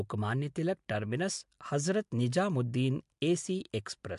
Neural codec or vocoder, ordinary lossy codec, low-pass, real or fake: none; MP3, 64 kbps; 10.8 kHz; real